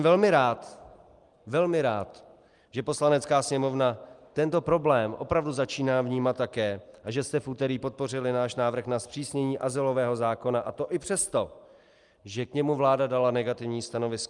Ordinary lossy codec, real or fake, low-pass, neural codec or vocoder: Opus, 32 kbps; real; 10.8 kHz; none